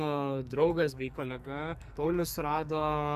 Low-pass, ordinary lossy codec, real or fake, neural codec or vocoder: 14.4 kHz; MP3, 96 kbps; fake; codec, 44.1 kHz, 2.6 kbps, SNAC